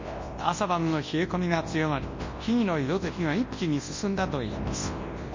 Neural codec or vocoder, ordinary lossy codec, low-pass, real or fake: codec, 24 kHz, 0.9 kbps, WavTokenizer, large speech release; MP3, 32 kbps; 7.2 kHz; fake